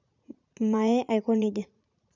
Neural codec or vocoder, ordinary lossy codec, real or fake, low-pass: vocoder, 44.1 kHz, 80 mel bands, Vocos; none; fake; 7.2 kHz